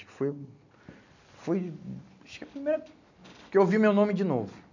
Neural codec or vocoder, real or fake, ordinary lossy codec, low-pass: none; real; none; 7.2 kHz